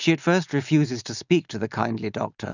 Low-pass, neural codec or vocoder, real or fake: 7.2 kHz; none; real